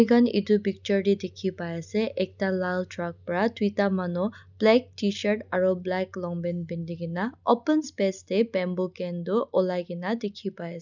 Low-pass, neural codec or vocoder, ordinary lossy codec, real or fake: 7.2 kHz; none; none; real